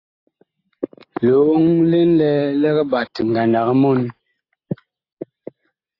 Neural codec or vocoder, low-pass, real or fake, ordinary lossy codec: none; 5.4 kHz; real; AAC, 32 kbps